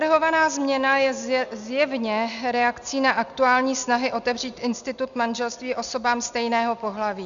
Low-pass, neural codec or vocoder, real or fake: 7.2 kHz; none; real